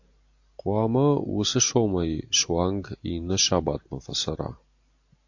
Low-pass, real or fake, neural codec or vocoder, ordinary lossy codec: 7.2 kHz; real; none; AAC, 48 kbps